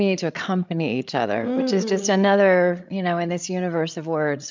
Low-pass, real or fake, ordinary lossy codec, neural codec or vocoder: 7.2 kHz; fake; MP3, 64 kbps; codec, 16 kHz, 8 kbps, FreqCodec, larger model